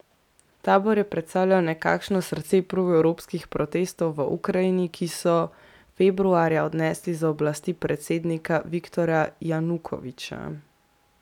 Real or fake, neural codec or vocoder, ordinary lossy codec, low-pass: real; none; none; 19.8 kHz